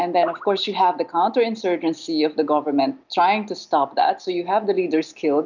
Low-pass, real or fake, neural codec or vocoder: 7.2 kHz; real; none